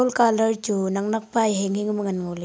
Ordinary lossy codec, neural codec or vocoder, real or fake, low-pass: none; none; real; none